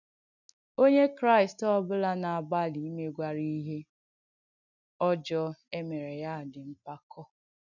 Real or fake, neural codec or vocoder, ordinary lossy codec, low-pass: real; none; none; 7.2 kHz